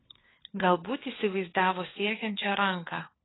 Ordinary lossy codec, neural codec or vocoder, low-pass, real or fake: AAC, 16 kbps; codec, 16 kHz, 4 kbps, FunCodec, trained on LibriTTS, 50 frames a second; 7.2 kHz; fake